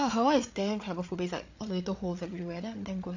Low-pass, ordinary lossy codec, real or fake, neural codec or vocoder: 7.2 kHz; AAC, 48 kbps; fake; codec, 16 kHz, 8 kbps, FreqCodec, larger model